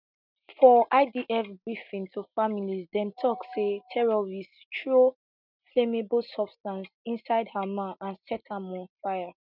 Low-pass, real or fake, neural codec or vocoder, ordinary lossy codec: 5.4 kHz; real; none; none